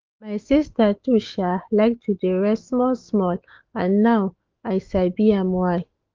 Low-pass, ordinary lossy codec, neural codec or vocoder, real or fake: 7.2 kHz; Opus, 32 kbps; autoencoder, 48 kHz, 128 numbers a frame, DAC-VAE, trained on Japanese speech; fake